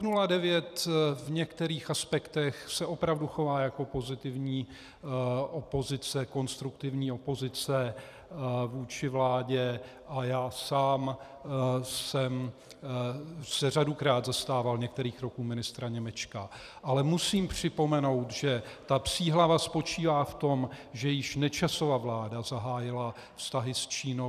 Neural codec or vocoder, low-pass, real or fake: vocoder, 44.1 kHz, 128 mel bands every 256 samples, BigVGAN v2; 14.4 kHz; fake